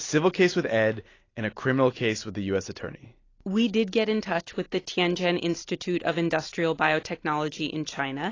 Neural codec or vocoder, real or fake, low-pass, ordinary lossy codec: none; real; 7.2 kHz; AAC, 32 kbps